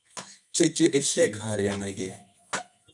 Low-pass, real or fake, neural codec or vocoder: 10.8 kHz; fake; codec, 24 kHz, 0.9 kbps, WavTokenizer, medium music audio release